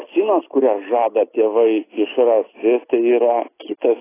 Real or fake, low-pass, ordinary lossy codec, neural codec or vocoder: real; 3.6 kHz; AAC, 16 kbps; none